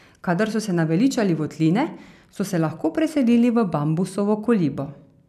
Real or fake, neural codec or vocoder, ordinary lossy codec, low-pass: real; none; none; 14.4 kHz